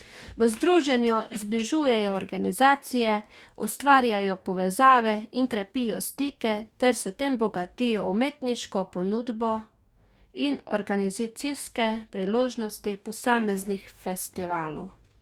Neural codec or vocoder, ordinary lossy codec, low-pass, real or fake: codec, 44.1 kHz, 2.6 kbps, DAC; Opus, 64 kbps; 19.8 kHz; fake